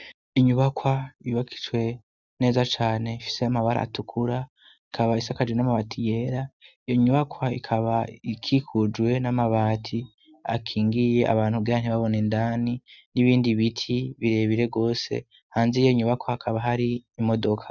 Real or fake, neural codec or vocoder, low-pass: real; none; 7.2 kHz